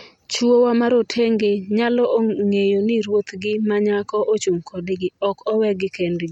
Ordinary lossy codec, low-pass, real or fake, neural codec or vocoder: MP3, 64 kbps; 19.8 kHz; real; none